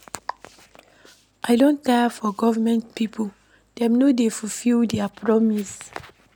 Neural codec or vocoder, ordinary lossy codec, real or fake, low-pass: none; none; real; 19.8 kHz